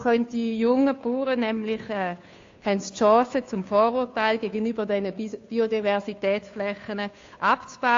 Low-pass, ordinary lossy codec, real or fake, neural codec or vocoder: 7.2 kHz; AAC, 48 kbps; fake; codec, 16 kHz, 2 kbps, FunCodec, trained on Chinese and English, 25 frames a second